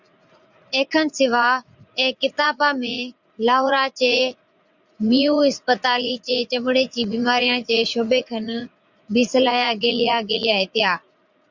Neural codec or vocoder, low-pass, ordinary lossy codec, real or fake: vocoder, 44.1 kHz, 80 mel bands, Vocos; 7.2 kHz; Opus, 64 kbps; fake